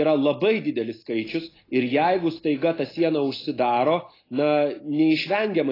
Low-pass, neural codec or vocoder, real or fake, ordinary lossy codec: 5.4 kHz; none; real; AAC, 24 kbps